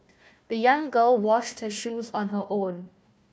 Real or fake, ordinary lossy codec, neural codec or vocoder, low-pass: fake; none; codec, 16 kHz, 1 kbps, FunCodec, trained on Chinese and English, 50 frames a second; none